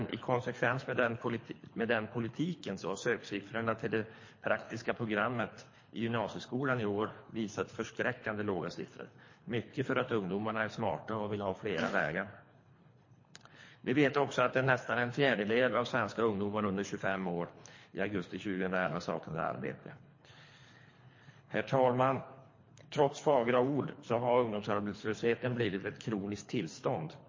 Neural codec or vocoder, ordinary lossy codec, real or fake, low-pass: codec, 24 kHz, 3 kbps, HILCodec; MP3, 32 kbps; fake; 7.2 kHz